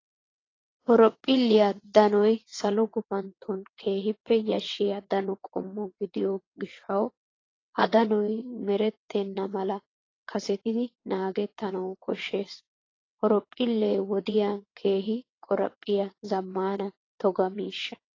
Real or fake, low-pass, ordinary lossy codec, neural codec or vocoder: fake; 7.2 kHz; AAC, 32 kbps; vocoder, 22.05 kHz, 80 mel bands, WaveNeXt